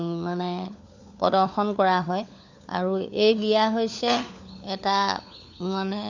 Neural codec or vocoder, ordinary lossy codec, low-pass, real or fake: codec, 16 kHz, 4 kbps, FunCodec, trained on LibriTTS, 50 frames a second; none; 7.2 kHz; fake